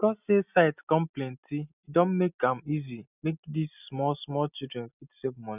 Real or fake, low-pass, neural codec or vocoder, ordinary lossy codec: real; 3.6 kHz; none; none